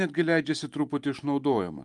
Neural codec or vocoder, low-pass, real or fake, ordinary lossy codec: vocoder, 44.1 kHz, 128 mel bands every 512 samples, BigVGAN v2; 10.8 kHz; fake; Opus, 32 kbps